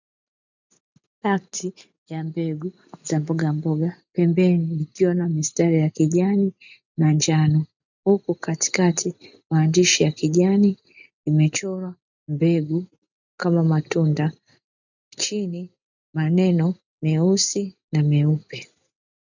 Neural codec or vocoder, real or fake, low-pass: none; real; 7.2 kHz